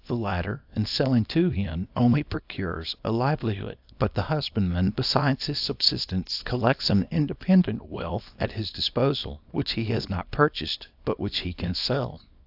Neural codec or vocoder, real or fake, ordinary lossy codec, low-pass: codec, 24 kHz, 0.9 kbps, WavTokenizer, small release; fake; AAC, 48 kbps; 5.4 kHz